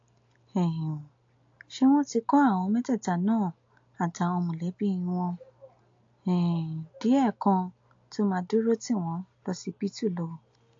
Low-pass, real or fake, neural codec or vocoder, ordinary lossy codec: 7.2 kHz; real; none; AAC, 48 kbps